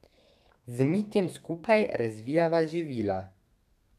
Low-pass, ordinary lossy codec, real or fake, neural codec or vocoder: 14.4 kHz; none; fake; codec, 32 kHz, 1.9 kbps, SNAC